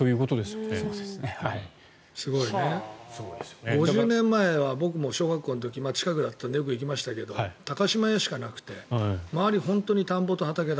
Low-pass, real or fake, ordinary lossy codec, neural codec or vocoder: none; real; none; none